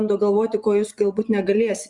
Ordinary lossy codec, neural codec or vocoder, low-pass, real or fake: Opus, 64 kbps; vocoder, 24 kHz, 100 mel bands, Vocos; 10.8 kHz; fake